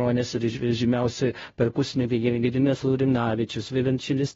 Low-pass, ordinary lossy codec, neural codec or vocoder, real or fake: 7.2 kHz; AAC, 24 kbps; codec, 16 kHz, 0.5 kbps, FunCodec, trained on Chinese and English, 25 frames a second; fake